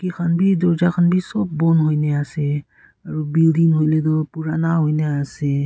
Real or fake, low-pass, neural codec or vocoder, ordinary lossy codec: real; none; none; none